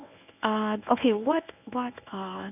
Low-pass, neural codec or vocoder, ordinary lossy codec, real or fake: 3.6 kHz; codec, 24 kHz, 0.9 kbps, WavTokenizer, medium speech release version 1; none; fake